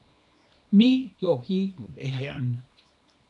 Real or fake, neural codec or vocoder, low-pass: fake; codec, 24 kHz, 0.9 kbps, WavTokenizer, small release; 10.8 kHz